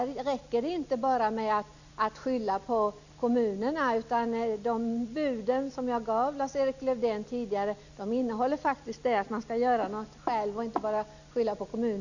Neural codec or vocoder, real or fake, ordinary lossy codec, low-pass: none; real; none; 7.2 kHz